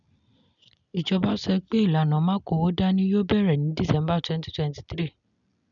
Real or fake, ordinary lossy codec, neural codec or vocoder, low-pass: real; none; none; 7.2 kHz